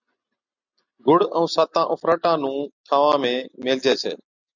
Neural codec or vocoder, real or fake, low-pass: none; real; 7.2 kHz